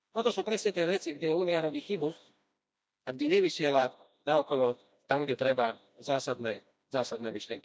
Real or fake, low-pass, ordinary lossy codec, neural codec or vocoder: fake; none; none; codec, 16 kHz, 1 kbps, FreqCodec, smaller model